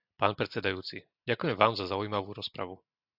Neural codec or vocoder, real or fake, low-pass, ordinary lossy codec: none; real; 5.4 kHz; AAC, 48 kbps